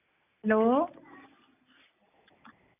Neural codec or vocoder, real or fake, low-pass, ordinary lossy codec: none; real; 3.6 kHz; none